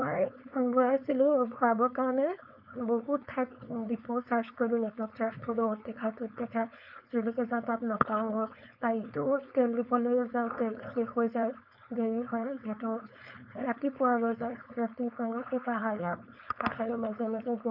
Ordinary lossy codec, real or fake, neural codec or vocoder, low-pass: none; fake; codec, 16 kHz, 4.8 kbps, FACodec; 5.4 kHz